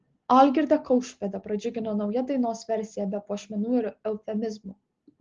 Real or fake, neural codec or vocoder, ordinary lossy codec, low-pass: real; none; Opus, 16 kbps; 7.2 kHz